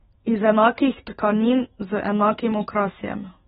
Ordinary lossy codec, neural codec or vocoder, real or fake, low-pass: AAC, 16 kbps; autoencoder, 48 kHz, 128 numbers a frame, DAC-VAE, trained on Japanese speech; fake; 19.8 kHz